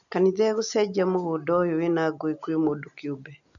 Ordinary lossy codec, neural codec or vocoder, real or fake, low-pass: none; none; real; 7.2 kHz